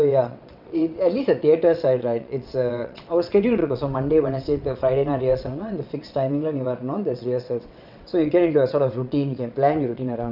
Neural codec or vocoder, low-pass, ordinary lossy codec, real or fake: vocoder, 22.05 kHz, 80 mel bands, WaveNeXt; 5.4 kHz; none; fake